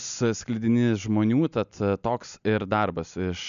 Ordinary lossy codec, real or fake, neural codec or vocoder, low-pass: MP3, 96 kbps; real; none; 7.2 kHz